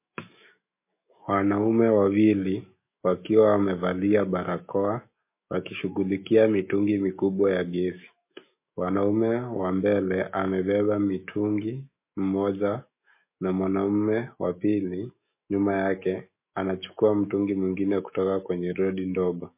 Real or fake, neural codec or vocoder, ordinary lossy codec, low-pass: fake; autoencoder, 48 kHz, 128 numbers a frame, DAC-VAE, trained on Japanese speech; MP3, 24 kbps; 3.6 kHz